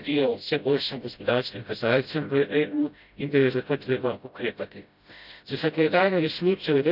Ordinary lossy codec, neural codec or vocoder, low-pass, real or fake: none; codec, 16 kHz, 0.5 kbps, FreqCodec, smaller model; 5.4 kHz; fake